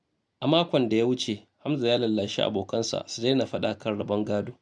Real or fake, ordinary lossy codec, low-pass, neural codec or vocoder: real; none; none; none